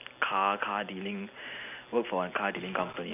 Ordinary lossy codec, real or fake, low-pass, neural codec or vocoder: none; real; 3.6 kHz; none